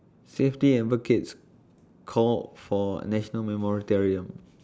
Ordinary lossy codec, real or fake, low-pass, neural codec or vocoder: none; real; none; none